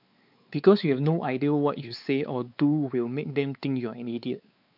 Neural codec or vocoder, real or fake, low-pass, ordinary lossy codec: codec, 16 kHz, 4 kbps, X-Codec, WavLM features, trained on Multilingual LibriSpeech; fake; 5.4 kHz; none